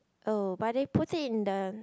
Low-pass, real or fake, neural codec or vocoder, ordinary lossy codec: none; real; none; none